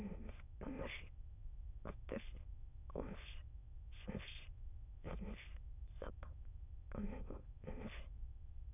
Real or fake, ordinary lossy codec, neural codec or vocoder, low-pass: fake; none; autoencoder, 22.05 kHz, a latent of 192 numbers a frame, VITS, trained on many speakers; 3.6 kHz